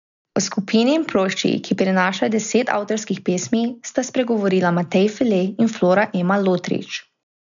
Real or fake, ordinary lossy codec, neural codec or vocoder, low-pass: real; none; none; 7.2 kHz